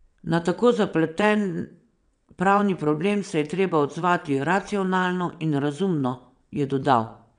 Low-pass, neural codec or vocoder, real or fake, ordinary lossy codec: 9.9 kHz; vocoder, 22.05 kHz, 80 mel bands, WaveNeXt; fake; none